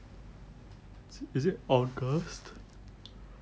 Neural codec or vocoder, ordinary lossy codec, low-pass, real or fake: none; none; none; real